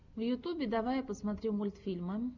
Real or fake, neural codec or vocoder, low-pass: real; none; 7.2 kHz